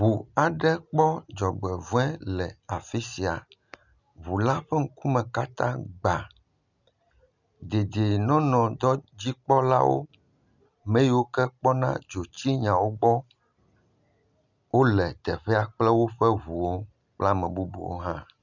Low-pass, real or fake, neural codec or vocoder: 7.2 kHz; real; none